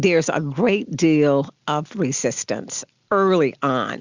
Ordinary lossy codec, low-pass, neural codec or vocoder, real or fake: Opus, 64 kbps; 7.2 kHz; none; real